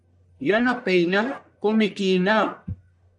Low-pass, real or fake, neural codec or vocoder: 10.8 kHz; fake; codec, 44.1 kHz, 1.7 kbps, Pupu-Codec